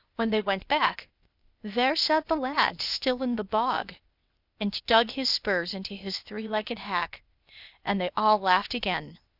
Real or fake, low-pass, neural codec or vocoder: fake; 5.4 kHz; codec, 16 kHz, 0.8 kbps, ZipCodec